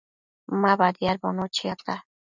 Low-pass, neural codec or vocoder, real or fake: 7.2 kHz; none; real